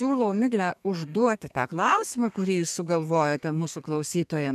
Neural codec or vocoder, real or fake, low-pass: codec, 32 kHz, 1.9 kbps, SNAC; fake; 14.4 kHz